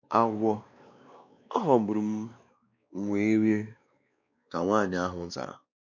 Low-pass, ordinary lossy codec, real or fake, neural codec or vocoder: 7.2 kHz; none; fake; codec, 16 kHz, 2 kbps, X-Codec, WavLM features, trained on Multilingual LibriSpeech